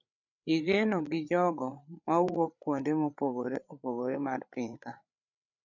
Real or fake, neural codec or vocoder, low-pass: fake; codec, 16 kHz, 8 kbps, FreqCodec, larger model; 7.2 kHz